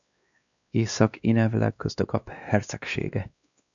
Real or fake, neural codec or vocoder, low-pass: fake; codec, 16 kHz, 2 kbps, X-Codec, WavLM features, trained on Multilingual LibriSpeech; 7.2 kHz